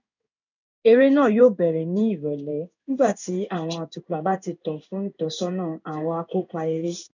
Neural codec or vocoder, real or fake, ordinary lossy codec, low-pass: codec, 16 kHz in and 24 kHz out, 1 kbps, XY-Tokenizer; fake; none; 7.2 kHz